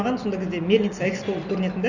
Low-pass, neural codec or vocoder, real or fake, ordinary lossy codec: 7.2 kHz; none; real; none